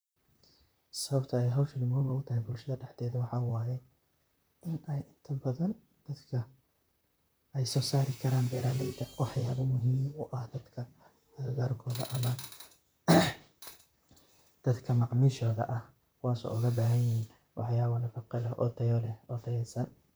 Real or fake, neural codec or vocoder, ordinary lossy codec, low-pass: fake; vocoder, 44.1 kHz, 128 mel bands, Pupu-Vocoder; none; none